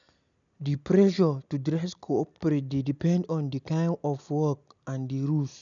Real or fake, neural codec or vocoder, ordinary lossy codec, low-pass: real; none; none; 7.2 kHz